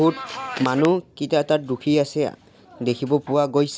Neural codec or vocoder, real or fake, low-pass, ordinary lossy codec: none; real; none; none